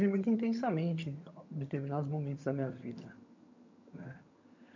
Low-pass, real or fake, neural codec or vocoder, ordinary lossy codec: 7.2 kHz; fake; vocoder, 22.05 kHz, 80 mel bands, HiFi-GAN; MP3, 64 kbps